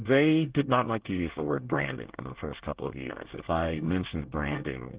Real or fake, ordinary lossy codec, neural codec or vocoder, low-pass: fake; Opus, 16 kbps; codec, 24 kHz, 1 kbps, SNAC; 3.6 kHz